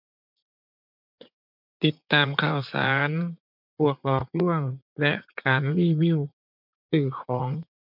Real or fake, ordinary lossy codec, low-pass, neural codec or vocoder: fake; MP3, 48 kbps; 5.4 kHz; vocoder, 44.1 kHz, 80 mel bands, Vocos